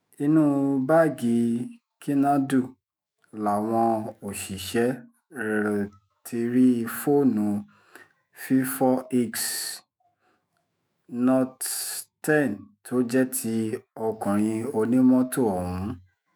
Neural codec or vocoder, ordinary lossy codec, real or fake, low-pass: autoencoder, 48 kHz, 128 numbers a frame, DAC-VAE, trained on Japanese speech; none; fake; none